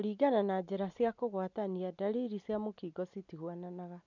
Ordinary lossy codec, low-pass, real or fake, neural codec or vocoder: none; 7.2 kHz; real; none